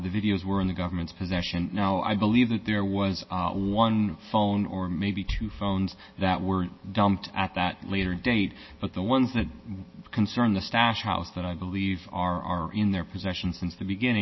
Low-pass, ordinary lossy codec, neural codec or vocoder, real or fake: 7.2 kHz; MP3, 24 kbps; none; real